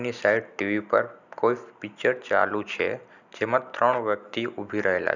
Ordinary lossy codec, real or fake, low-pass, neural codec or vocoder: none; real; 7.2 kHz; none